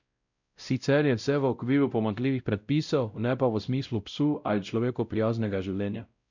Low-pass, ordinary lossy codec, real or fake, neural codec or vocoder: 7.2 kHz; none; fake; codec, 16 kHz, 0.5 kbps, X-Codec, WavLM features, trained on Multilingual LibriSpeech